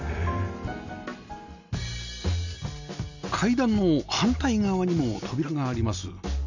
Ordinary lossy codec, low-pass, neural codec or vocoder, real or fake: none; 7.2 kHz; none; real